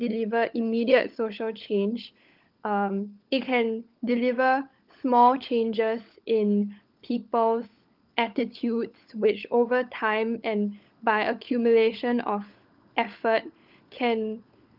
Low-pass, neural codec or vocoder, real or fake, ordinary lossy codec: 5.4 kHz; codec, 16 kHz, 16 kbps, FunCodec, trained on LibriTTS, 50 frames a second; fake; Opus, 24 kbps